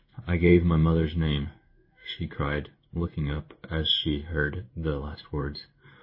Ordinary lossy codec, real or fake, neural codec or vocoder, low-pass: MP3, 24 kbps; fake; codec, 16 kHz in and 24 kHz out, 1 kbps, XY-Tokenizer; 5.4 kHz